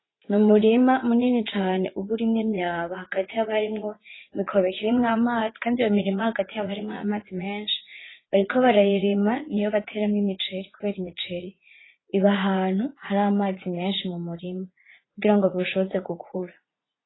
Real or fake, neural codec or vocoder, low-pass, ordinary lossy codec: fake; vocoder, 44.1 kHz, 80 mel bands, Vocos; 7.2 kHz; AAC, 16 kbps